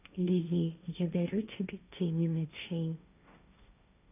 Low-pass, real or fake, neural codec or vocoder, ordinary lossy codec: 3.6 kHz; fake; codec, 16 kHz, 1.1 kbps, Voila-Tokenizer; none